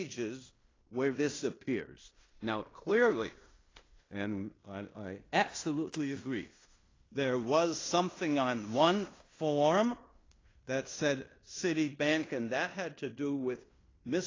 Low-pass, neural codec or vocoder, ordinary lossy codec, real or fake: 7.2 kHz; codec, 16 kHz in and 24 kHz out, 0.9 kbps, LongCat-Audio-Codec, fine tuned four codebook decoder; AAC, 32 kbps; fake